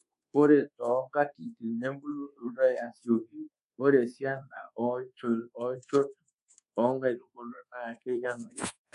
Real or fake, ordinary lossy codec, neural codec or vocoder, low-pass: fake; MP3, 64 kbps; codec, 24 kHz, 1.2 kbps, DualCodec; 10.8 kHz